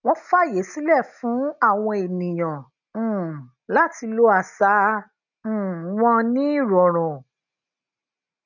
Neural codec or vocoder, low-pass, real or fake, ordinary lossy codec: none; 7.2 kHz; real; none